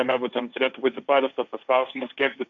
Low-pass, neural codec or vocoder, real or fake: 7.2 kHz; codec, 16 kHz, 1.1 kbps, Voila-Tokenizer; fake